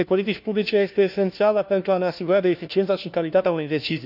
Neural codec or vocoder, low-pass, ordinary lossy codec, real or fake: codec, 16 kHz, 1 kbps, FunCodec, trained on LibriTTS, 50 frames a second; 5.4 kHz; none; fake